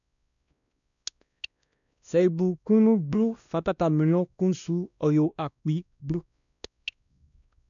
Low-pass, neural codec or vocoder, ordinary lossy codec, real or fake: 7.2 kHz; codec, 16 kHz, 1 kbps, X-Codec, WavLM features, trained on Multilingual LibriSpeech; none; fake